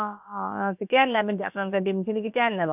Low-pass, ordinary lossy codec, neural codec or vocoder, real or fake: 3.6 kHz; none; codec, 16 kHz, about 1 kbps, DyCAST, with the encoder's durations; fake